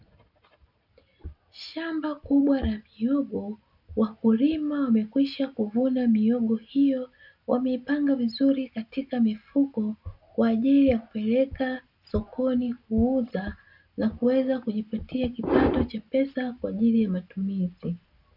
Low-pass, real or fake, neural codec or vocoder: 5.4 kHz; real; none